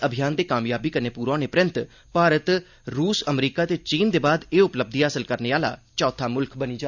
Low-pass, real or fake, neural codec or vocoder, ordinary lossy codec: 7.2 kHz; real; none; none